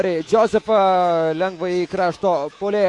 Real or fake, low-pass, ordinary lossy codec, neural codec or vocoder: fake; 10.8 kHz; AAC, 48 kbps; codec, 24 kHz, 3.1 kbps, DualCodec